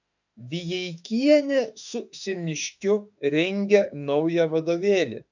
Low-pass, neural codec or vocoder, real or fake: 7.2 kHz; autoencoder, 48 kHz, 32 numbers a frame, DAC-VAE, trained on Japanese speech; fake